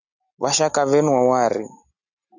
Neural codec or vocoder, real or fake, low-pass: none; real; 7.2 kHz